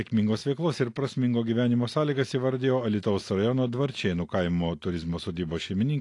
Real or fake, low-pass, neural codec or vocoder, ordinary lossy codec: real; 10.8 kHz; none; AAC, 48 kbps